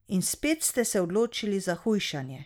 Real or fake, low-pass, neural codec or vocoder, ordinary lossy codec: real; none; none; none